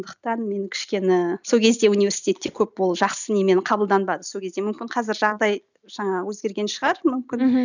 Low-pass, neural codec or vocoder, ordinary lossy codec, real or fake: 7.2 kHz; none; none; real